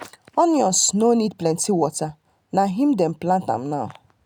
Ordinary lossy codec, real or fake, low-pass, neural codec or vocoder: none; real; none; none